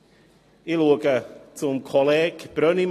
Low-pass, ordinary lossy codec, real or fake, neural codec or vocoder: 14.4 kHz; AAC, 48 kbps; real; none